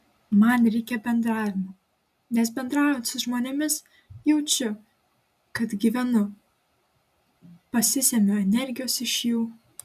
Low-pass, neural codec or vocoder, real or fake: 14.4 kHz; none; real